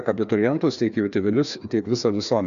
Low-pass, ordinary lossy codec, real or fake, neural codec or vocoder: 7.2 kHz; MP3, 96 kbps; fake; codec, 16 kHz, 2 kbps, FreqCodec, larger model